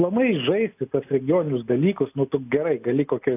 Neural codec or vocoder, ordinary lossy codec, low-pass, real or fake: none; AAC, 32 kbps; 3.6 kHz; real